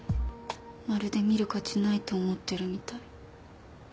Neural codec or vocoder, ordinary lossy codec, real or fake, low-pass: none; none; real; none